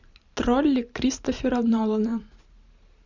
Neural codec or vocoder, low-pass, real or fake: vocoder, 44.1 kHz, 128 mel bands every 512 samples, BigVGAN v2; 7.2 kHz; fake